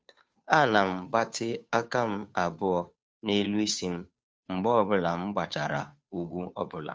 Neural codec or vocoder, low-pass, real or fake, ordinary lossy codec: codec, 16 kHz, 2 kbps, FunCodec, trained on Chinese and English, 25 frames a second; none; fake; none